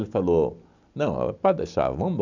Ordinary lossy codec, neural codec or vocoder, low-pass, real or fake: none; none; 7.2 kHz; real